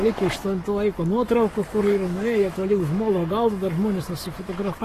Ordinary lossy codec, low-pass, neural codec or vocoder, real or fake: AAC, 48 kbps; 14.4 kHz; codec, 44.1 kHz, 7.8 kbps, Pupu-Codec; fake